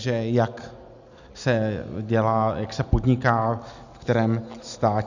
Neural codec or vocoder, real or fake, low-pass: none; real; 7.2 kHz